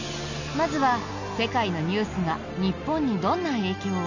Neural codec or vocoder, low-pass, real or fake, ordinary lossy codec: none; 7.2 kHz; real; none